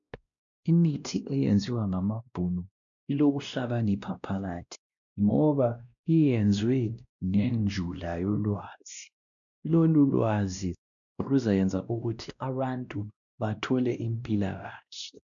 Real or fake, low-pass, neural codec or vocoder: fake; 7.2 kHz; codec, 16 kHz, 1 kbps, X-Codec, WavLM features, trained on Multilingual LibriSpeech